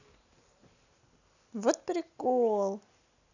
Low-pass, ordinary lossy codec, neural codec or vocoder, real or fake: 7.2 kHz; none; none; real